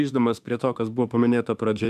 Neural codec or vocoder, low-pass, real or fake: autoencoder, 48 kHz, 32 numbers a frame, DAC-VAE, trained on Japanese speech; 14.4 kHz; fake